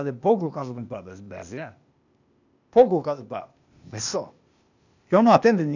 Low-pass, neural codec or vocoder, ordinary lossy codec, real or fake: 7.2 kHz; codec, 16 kHz, 0.8 kbps, ZipCodec; none; fake